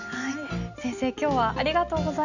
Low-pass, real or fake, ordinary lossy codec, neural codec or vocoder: 7.2 kHz; real; none; none